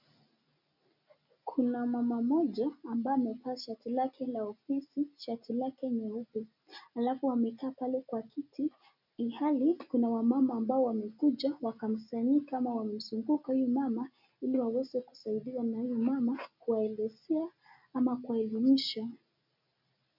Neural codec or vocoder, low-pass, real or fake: none; 5.4 kHz; real